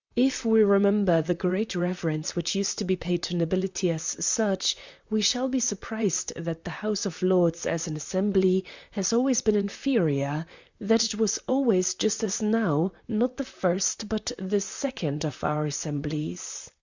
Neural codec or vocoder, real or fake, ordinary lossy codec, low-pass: vocoder, 44.1 kHz, 128 mel bands, Pupu-Vocoder; fake; Opus, 64 kbps; 7.2 kHz